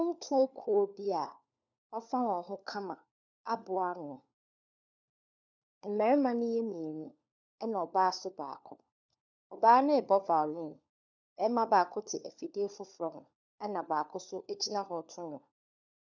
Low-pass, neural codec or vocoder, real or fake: 7.2 kHz; codec, 16 kHz, 4 kbps, FunCodec, trained on LibriTTS, 50 frames a second; fake